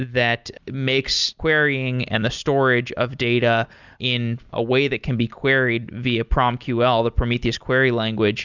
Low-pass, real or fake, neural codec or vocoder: 7.2 kHz; real; none